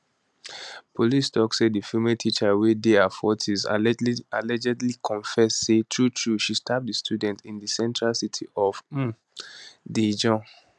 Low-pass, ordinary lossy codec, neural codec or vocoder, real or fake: none; none; none; real